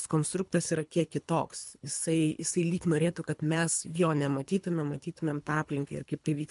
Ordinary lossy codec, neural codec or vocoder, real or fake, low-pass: MP3, 64 kbps; codec, 24 kHz, 3 kbps, HILCodec; fake; 10.8 kHz